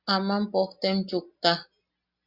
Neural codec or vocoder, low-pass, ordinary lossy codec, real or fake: none; 5.4 kHz; Opus, 64 kbps; real